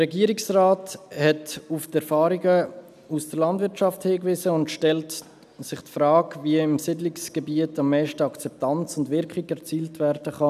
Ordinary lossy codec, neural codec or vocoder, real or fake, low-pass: none; none; real; 14.4 kHz